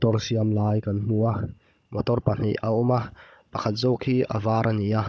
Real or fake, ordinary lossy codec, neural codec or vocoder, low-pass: fake; none; codec, 16 kHz, 16 kbps, FunCodec, trained on Chinese and English, 50 frames a second; none